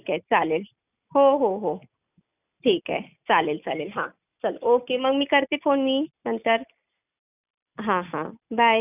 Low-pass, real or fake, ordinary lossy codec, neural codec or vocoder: 3.6 kHz; real; none; none